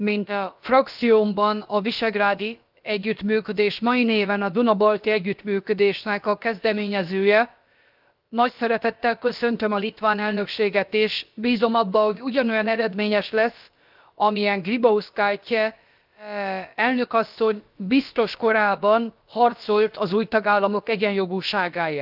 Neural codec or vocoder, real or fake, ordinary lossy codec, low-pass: codec, 16 kHz, about 1 kbps, DyCAST, with the encoder's durations; fake; Opus, 24 kbps; 5.4 kHz